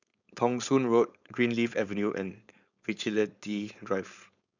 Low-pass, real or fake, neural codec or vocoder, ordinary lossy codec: 7.2 kHz; fake; codec, 16 kHz, 4.8 kbps, FACodec; none